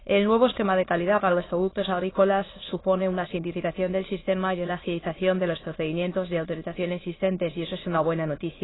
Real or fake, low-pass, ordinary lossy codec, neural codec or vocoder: fake; 7.2 kHz; AAC, 16 kbps; autoencoder, 22.05 kHz, a latent of 192 numbers a frame, VITS, trained on many speakers